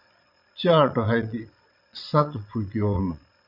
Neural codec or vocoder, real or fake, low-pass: vocoder, 22.05 kHz, 80 mel bands, Vocos; fake; 5.4 kHz